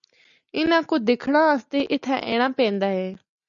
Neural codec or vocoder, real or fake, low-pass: none; real; 7.2 kHz